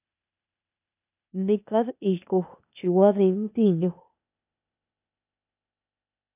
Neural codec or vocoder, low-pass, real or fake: codec, 16 kHz, 0.8 kbps, ZipCodec; 3.6 kHz; fake